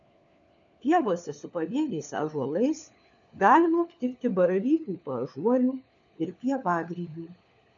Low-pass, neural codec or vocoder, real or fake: 7.2 kHz; codec, 16 kHz, 4 kbps, FunCodec, trained on LibriTTS, 50 frames a second; fake